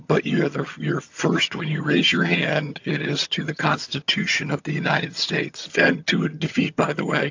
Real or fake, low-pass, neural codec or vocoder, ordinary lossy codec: fake; 7.2 kHz; vocoder, 22.05 kHz, 80 mel bands, HiFi-GAN; AAC, 48 kbps